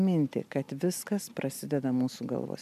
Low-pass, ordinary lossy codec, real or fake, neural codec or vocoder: 14.4 kHz; MP3, 96 kbps; real; none